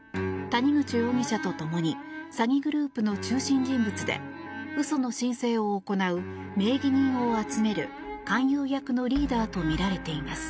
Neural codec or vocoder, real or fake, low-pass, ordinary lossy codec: none; real; none; none